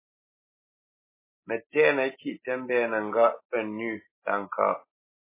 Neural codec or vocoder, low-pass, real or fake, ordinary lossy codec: none; 3.6 kHz; real; MP3, 16 kbps